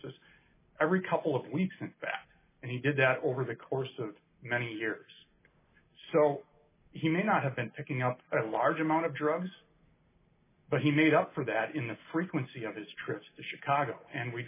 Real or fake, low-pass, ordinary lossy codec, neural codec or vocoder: real; 3.6 kHz; MP3, 16 kbps; none